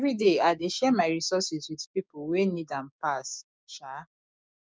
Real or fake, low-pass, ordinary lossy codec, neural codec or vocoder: fake; none; none; codec, 16 kHz, 6 kbps, DAC